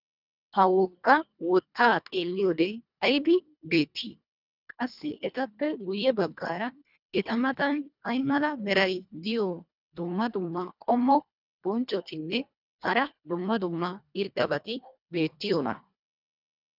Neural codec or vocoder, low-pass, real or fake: codec, 24 kHz, 1.5 kbps, HILCodec; 5.4 kHz; fake